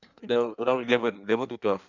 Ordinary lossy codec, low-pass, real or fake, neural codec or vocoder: none; 7.2 kHz; fake; codec, 16 kHz in and 24 kHz out, 1.1 kbps, FireRedTTS-2 codec